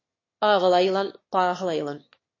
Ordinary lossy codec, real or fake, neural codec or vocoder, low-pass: MP3, 32 kbps; fake; autoencoder, 22.05 kHz, a latent of 192 numbers a frame, VITS, trained on one speaker; 7.2 kHz